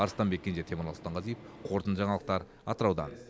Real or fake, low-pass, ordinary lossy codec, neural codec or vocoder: real; none; none; none